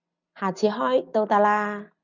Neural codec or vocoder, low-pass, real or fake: none; 7.2 kHz; real